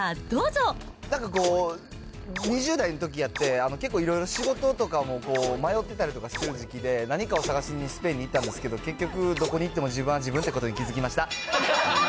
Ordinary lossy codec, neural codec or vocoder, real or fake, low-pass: none; none; real; none